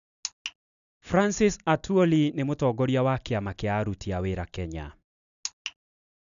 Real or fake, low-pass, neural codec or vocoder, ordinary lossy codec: real; 7.2 kHz; none; none